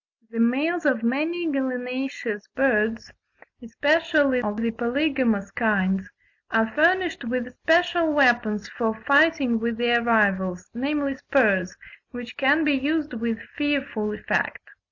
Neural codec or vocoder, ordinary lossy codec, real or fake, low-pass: none; AAC, 48 kbps; real; 7.2 kHz